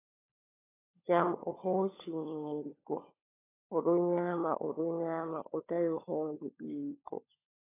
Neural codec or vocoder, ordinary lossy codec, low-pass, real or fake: codec, 16 kHz, 16 kbps, FunCodec, trained on LibriTTS, 50 frames a second; AAC, 16 kbps; 3.6 kHz; fake